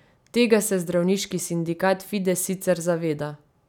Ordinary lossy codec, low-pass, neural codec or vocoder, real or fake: none; 19.8 kHz; none; real